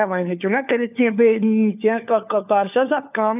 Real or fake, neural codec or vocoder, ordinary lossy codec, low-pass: fake; codec, 16 kHz, 4 kbps, FunCodec, trained on LibriTTS, 50 frames a second; none; 3.6 kHz